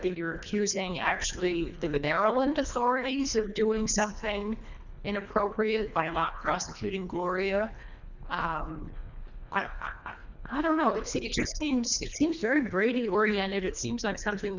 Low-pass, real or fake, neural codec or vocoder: 7.2 kHz; fake; codec, 24 kHz, 1.5 kbps, HILCodec